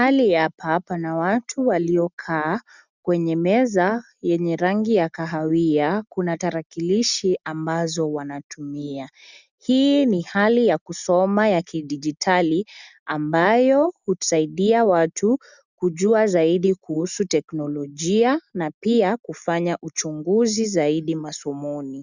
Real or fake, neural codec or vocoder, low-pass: real; none; 7.2 kHz